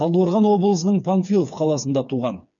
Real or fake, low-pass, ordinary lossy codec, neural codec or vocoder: fake; 7.2 kHz; none; codec, 16 kHz, 4 kbps, FreqCodec, smaller model